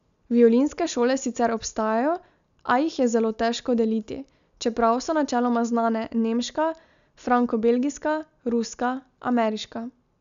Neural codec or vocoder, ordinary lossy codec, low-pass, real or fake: none; none; 7.2 kHz; real